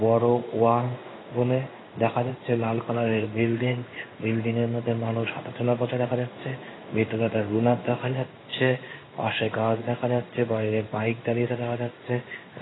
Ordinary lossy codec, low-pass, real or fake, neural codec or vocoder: AAC, 16 kbps; 7.2 kHz; fake; codec, 16 kHz in and 24 kHz out, 1 kbps, XY-Tokenizer